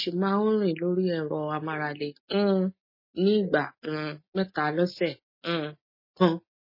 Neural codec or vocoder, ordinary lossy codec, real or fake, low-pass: none; MP3, 24 kbps; real; 5.4 kHz